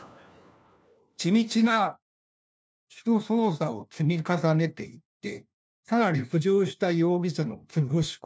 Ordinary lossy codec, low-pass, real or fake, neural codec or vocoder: none; none; fake; codec, 16 kHz, 1 kbps, FunCodec, trained on LibriTTS, 50 frames a second